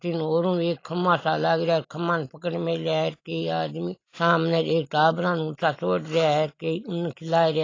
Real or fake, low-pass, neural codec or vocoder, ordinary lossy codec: real; 7.2 kHz; none; AAC, 32 kbps